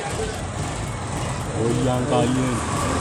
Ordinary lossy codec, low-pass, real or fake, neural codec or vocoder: none; none; real; none